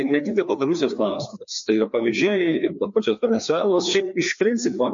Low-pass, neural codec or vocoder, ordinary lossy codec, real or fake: 7.2 kHz; codec, 16 kHz, 2 kbps, FreqCodec, larger model; MP3, 48 kbps; fake